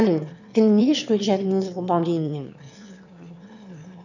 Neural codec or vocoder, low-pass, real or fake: autoencoder, 22.05 kHz, a latent of 192 numbers a frame, VITS, trained on one speaker; 7.2 kHz; fake